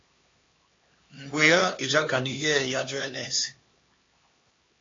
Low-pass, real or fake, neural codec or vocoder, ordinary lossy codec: 7.2 kHz; fake; codec, 16 kHz, 2 kbps, X-Codec, HuBERT features, trained on LibriSpeech; AAC, 32 kbps